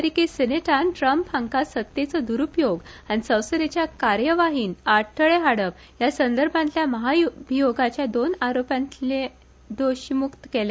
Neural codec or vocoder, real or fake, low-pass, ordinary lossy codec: none; real; none; none